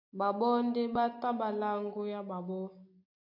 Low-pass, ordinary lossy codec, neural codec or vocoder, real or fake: 5.4 kHz; AAC, 32 kbps; none; real